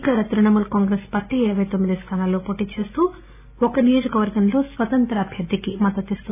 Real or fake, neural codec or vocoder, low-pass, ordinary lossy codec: real; none; 3.6 kHz; none